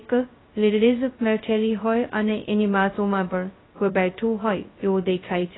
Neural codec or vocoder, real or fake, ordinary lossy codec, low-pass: codec, 16 kHz, 0.2 kbps, FocalCodec; fake; AAC, 16 kbps; 7.2 kHz